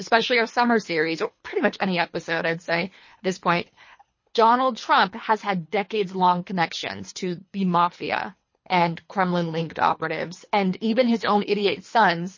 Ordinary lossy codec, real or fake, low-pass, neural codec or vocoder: MP3, 32 kbps; fake; 7.2 kHz; codec, 24 kHz, 3 kbps, HILCodec